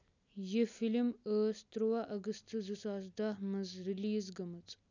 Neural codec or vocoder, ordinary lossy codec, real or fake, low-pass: none; none; real; 7.2 kHz